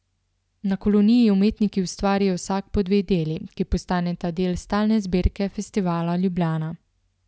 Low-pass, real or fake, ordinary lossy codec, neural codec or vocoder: none; real; none; none